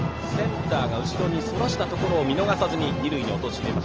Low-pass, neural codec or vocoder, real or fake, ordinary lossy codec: 7.2 kHz; none; real; Opus, 24 kbps